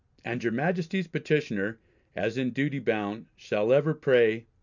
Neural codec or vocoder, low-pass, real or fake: none; 7.2 kHz; real